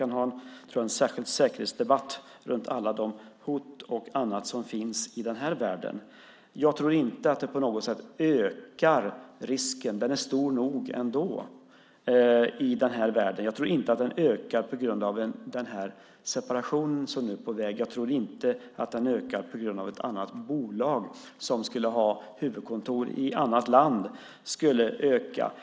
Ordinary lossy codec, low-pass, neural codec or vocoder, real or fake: none; none; none; real